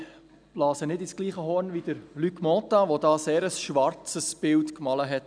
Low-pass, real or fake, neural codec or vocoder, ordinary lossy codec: 9.9 kHz; real; none; none